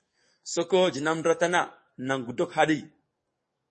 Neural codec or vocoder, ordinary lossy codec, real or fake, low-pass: codec, 44.1 kHz, 7.8 kbps, DAC; MP3, 32 kbps; fake; 10.8 kHz